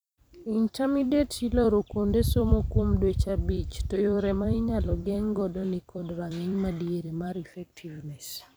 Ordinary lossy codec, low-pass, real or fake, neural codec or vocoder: none; none; fake; vocoder, 44.1 kHz, 128 mel bands every 256 samples, BigVGAN v2